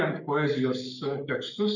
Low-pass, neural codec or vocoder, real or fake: 7.2 kHz; codec, 44.1 kHz, 7.8 kbps, Pupu-Codec; fake